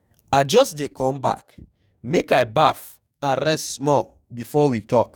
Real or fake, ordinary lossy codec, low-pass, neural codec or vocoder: fake; none; 19.8 kHz; codec, 44.1 kHz, 2.6 kbps, DAC